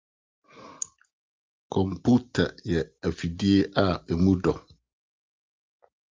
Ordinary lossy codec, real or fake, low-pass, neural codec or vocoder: Opus, 24 kbps; real; 7.2 kHz; none